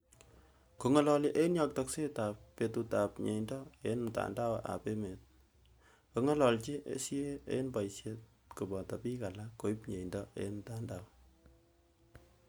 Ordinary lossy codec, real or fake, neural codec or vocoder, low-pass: none; real; none; none